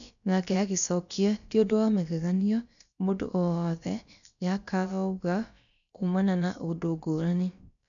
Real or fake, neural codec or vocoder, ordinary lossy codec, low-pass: fake; codec, 16 kHz, about 1 kbps, DyCAST, with the encoder's durations; none; 7.2 kHz